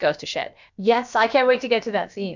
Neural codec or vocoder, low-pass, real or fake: codec, 16 kHz, about 1 kbps, DyCAST, with the encoder's durations; 7.2 kHz; fake